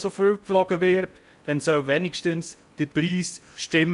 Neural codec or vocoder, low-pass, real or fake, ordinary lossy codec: codec, 16 kHz in and 24 kHz out, 0.6 kbps, FocalCodec, streaming, 4096 codes; 10.8 kHz; fake; none